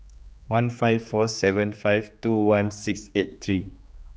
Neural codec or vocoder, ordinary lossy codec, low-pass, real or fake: codec, 16 kHz, 2 kbps, X-Codec, HuBERT features, trained on general audio; none; none; fake